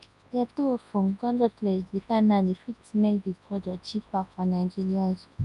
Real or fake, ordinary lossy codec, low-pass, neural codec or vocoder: fake; MP3, 96 kbps; 10.8 kHz; codec, 24 kHz, 0.9 kbps, WavTokenizer, large speech release